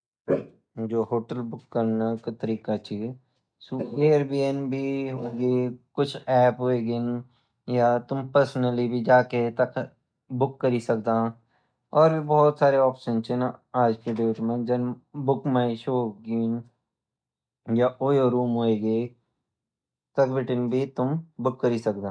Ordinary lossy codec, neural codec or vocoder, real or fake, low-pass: none; none; real; 9.9 kHz